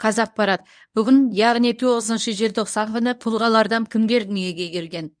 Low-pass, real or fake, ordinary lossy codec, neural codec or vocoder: 9.9 kHz; fake; none; codec, 24 kHz, 0.9 kbps, WavTokenizer, medium speech release version 1